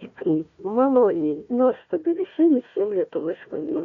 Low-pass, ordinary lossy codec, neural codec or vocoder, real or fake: 7.2 kHz; MP3, 96 kbps; codec, 16 kHz, 1 kbps, FunCodec, trained on Chinese and English, 50 frames a second; fake